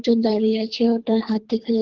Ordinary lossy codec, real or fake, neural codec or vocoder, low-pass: Opus, 16 kbps; fake; codec, 24 kHz, 3 kbps, HILCodec; 7.2 kHz